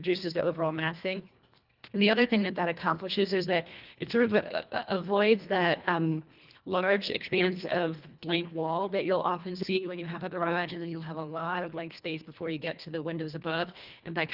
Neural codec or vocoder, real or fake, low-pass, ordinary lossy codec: codec, 24 kHz, 1.5 kbps, HILCodec; fake; 5.4 kHz; Opus, 32 kbps